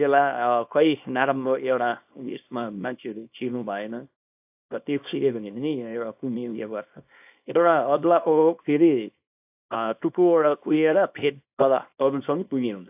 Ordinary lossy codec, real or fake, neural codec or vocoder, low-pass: AAC, 32 kbps; fake; codec, 24 kHz, 0.9 kbps, WavTokenizer, small release; 3.6 kHz